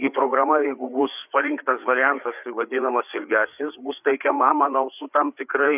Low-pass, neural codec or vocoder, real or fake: 3.6 kHz; codec, 16 kHz, 4 kbps, FreqCodec, larger model; fake